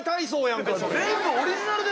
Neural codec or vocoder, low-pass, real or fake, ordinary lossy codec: none; none; real; none